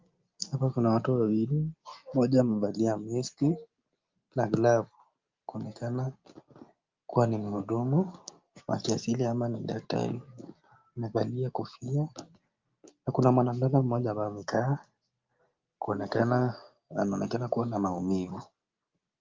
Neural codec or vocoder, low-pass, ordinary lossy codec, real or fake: none; 7.2 kHz; Opus, 32 kbps; real